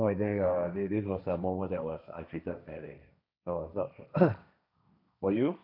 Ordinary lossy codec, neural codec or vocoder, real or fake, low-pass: none; codec, 16 kHz, 1.1 kbps, Voila-Tokenizer; fake; 5.4 kHz